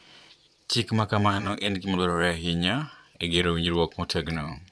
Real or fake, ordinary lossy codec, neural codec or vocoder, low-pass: fake; none; vocoder, 22.05 kHz, 80 mel bands, Vocos; none